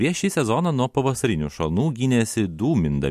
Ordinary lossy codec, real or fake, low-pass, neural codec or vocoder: MP3, 64 kbps; real; 14.4 kHz; none